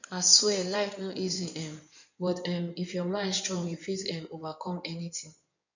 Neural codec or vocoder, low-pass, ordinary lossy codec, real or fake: codec, 16 kHz in and 24 kHz out, 2.2 kbps, FireRedTTS-2 codec; 7.2 kHz; none; fake